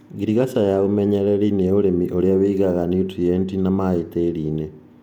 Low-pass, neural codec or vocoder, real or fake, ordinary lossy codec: 19.8 kHz; vocoder, 48 kHz, 128 mel bands, Vocos; fake; none